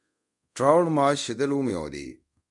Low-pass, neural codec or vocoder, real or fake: 10.8 kHz; codec, 24 kHz, 0.5 kbps, DualCodec; fake